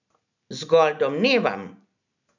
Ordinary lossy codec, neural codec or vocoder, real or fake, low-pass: none; none; real; 7.2 kHz